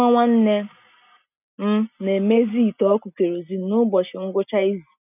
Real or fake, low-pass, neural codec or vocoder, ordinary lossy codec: real; 3.6 kHz; none; none